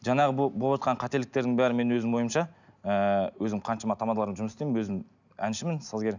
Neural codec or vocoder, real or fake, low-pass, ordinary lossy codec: none; real; 7.2 kHz; none